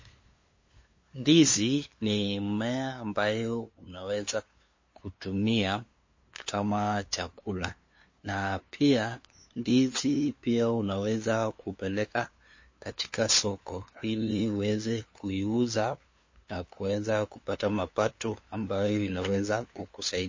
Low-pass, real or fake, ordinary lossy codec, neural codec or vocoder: 7.2 kHz; fake; MP3, 32 kbps; codec, 16 kHz, 2 kbps, FunCodec, trained on LibriTTS, 25 frames a second